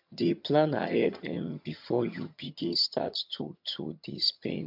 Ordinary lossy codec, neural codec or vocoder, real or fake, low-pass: MP3, 48 kbps; vocoder, 22.05 kHz, 80 mel bands, HiFi-GAN; fake; 5.4 kHz